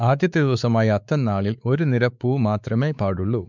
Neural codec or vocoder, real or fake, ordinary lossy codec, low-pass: codec, 16 kHz, 4 kbps, X-Codec, WavLM features, trained on Multilingual LibriSpeech; fake; none; 7.2 kHz